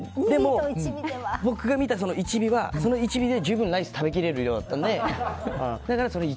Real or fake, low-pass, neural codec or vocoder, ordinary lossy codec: real; none; none; none